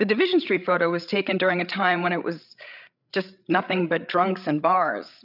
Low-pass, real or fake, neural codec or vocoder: 5.4 kHz; fake; codec, 16 kHz, 8 kbps, FreqCodec, larger model